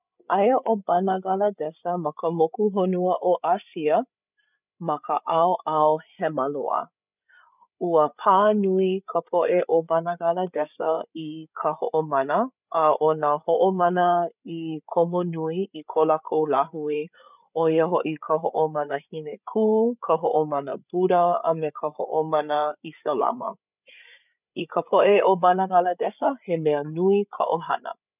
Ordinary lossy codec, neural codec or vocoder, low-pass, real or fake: none; codec, 16 kHz, 8 kbps, FreqCodec, larger model; 3.6 kHz; fake